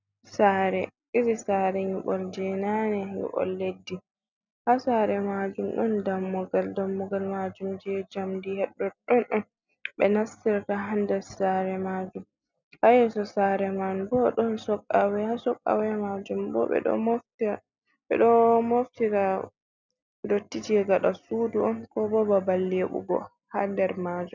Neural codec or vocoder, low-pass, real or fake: none; 7.2 kHz; real